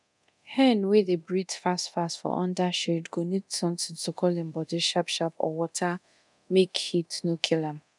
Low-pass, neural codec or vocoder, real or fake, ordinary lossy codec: 10.8 kHz; codec, 24 kHz, 0.9 kbps, DualCodec; fake; none